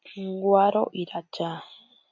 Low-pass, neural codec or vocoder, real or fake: 7.2 kHz; none; real